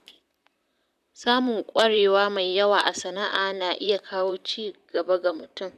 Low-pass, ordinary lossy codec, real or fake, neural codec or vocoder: 14.4 kHz; none; fake; vocoder, 44.1 kHz, 128 mel bands, Pupu-Vocoder